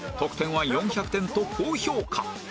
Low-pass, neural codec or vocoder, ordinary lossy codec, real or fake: none; none; none; real